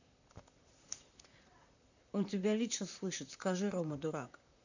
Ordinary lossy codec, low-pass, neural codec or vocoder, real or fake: none; 7.2 kHz; vocoder, 44.1 kHz, 128 mel bands, Pupu-Vocoder; fake